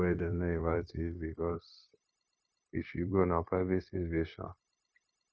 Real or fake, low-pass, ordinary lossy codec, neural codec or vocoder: fake; none; none; codec, 16 kHz, 0.9 kbps, LongCat-Audio-Codec